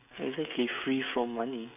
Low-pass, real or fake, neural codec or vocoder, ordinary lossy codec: 3.6 kHz; fake; codec, 16 kHz, 16 kbps, FreqCodec, smaller model; none